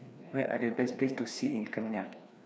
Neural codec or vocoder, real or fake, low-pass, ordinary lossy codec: codec, 16 kHz, 2 kbps, FreqCodec, larger model; fake; none; none